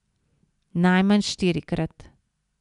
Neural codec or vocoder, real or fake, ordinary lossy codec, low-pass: none; real; none; 10.8 kHz